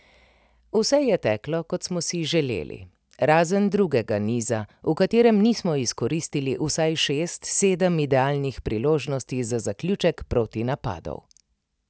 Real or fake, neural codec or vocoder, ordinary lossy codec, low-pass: real; none; none; none